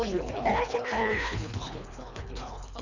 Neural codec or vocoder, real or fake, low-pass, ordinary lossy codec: codec, 24 kHz, 3 kbps, HILCodec; fake; 7.2 kHz; none